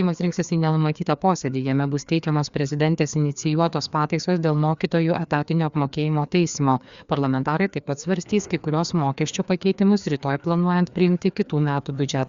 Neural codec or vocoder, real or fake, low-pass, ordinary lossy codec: codec, 16 kHz, 2 kbps, FreqCodec, larger model; fake; 7.2 kHz; Opus, 64 kbps